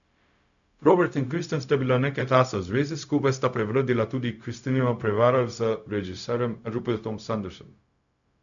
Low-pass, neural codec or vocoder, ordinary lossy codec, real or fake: 7.2 kHz; codec, 16 kHz, 0.4 kbps, LongCat-Audio-Codec; none; fake